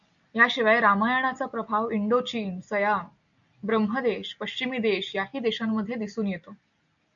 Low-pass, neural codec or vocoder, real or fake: 7.2 kHz; none; real